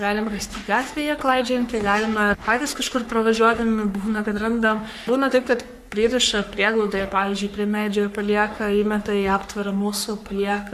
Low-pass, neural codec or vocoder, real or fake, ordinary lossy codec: 14.4 kHz; codec, 44.1 kHz, 3.4 kbps, Pupu-Codec; fake; AAC, 96 kbps